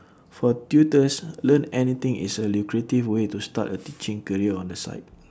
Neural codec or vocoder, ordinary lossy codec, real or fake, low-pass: none; none; real; none